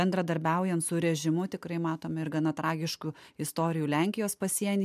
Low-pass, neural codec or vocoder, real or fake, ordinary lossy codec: 14.4 kHz; none; real; MP3, 96 kbps